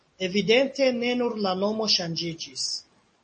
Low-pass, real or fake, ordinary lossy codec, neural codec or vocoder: 10.8 kHz; real; MP3, 32 kbps; none